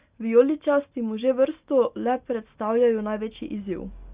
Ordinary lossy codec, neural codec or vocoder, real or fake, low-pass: Opus, 64 kbps; none; real; 3.6 kHz